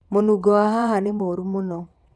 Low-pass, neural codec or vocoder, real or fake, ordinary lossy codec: none; vocoder, 22.05 kHz, 80 mel bands, WaveNeXt; fake; none